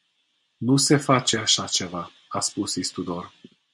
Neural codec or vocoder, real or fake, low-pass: none; real; 10.8 kHz